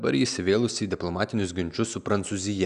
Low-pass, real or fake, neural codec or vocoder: 10.8 kHz; real; none